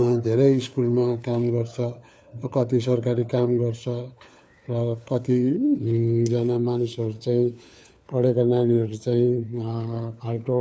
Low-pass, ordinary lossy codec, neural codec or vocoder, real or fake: none; none; codec, 16 kHz, 4 kbps, FunCodec, trained on LibriTTS, 50 frames a second; fake